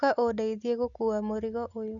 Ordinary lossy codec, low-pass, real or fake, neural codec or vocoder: none; 7.2 kHz; real; none